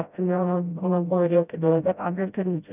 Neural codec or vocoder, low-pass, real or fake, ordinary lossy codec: codec, 16 kHz, 0.5 kbps, FreqCodec, smaller model; 3.6 kHz; fake; none